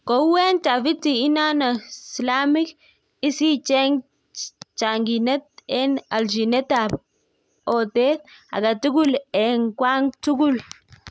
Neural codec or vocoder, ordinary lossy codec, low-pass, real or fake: none; none; none; real